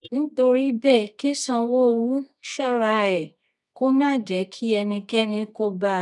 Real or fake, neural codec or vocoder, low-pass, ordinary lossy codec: fake; codec, 24 kHz, 0.9 kbps, WavTokenizer, medium music audio release; 10.8 kHz; none